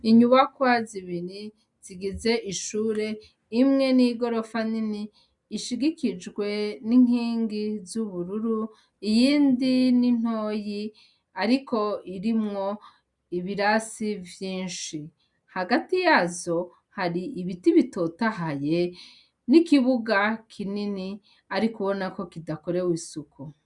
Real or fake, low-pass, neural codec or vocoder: real; 10.8 kHz; none